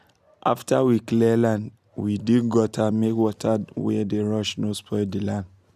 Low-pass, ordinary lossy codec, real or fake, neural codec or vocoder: 14.4 kHz; none; real; none